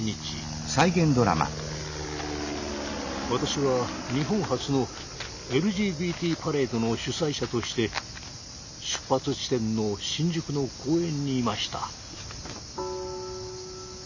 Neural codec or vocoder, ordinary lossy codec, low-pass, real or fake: none; none; 7.2 kHz; real